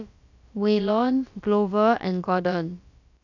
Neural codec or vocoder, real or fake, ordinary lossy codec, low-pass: codec, 16 kHz, about 1 kbps, DyCAST, with the encoder's durations; fake; none; 7.2 kHz